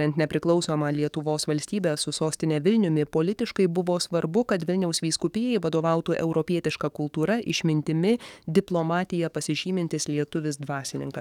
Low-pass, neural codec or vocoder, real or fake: 19.8 kHz; codec, 44.1 kHz, 7.8 kbps, DAC; fake